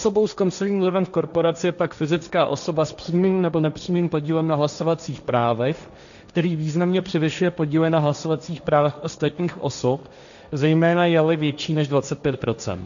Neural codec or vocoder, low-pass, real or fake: codec, 16 kHz, 1.1 kbps, Voila-Tokenizer; 7.2 kHz; fake